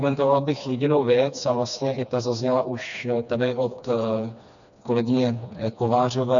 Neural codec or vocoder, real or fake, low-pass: codec, 16 kHz, 2 kbps, FreqCodec, smaller model; fake; 7.2 kHz